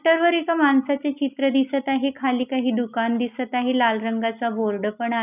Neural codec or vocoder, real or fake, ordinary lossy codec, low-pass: none; real; none; 3.6 kHz